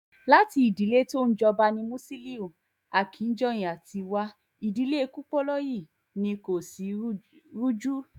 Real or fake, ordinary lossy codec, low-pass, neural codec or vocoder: fake; none; 19.8 kHz; autoencoder, 48 kHz, 128 numbers a frame, DAC-VAE, trained on Japanese speech